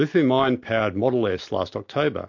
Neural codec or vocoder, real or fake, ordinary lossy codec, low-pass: none; real; MP3, 48 kbps; 7.2 kHz